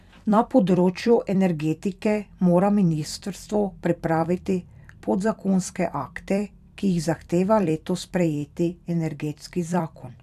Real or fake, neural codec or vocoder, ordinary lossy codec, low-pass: fake; vocoder, 44.1 kHz, 128 mel bands every 256 samples, BigVGAN v2; none; 14.4 kHz